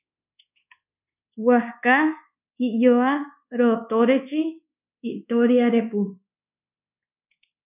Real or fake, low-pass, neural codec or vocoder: fake; 3.6 kHz; codec, 24 kHz, 1.2 kbps, DualCodec